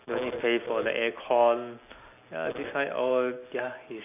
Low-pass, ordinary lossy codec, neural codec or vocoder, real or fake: 3.6 kHz; none; none; real